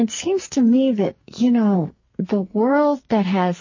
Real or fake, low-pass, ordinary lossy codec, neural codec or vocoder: fake; 7.2 kHz; MP3, 32 kbps; codec, 44.1 kHz, 2.6 kbps, SNAC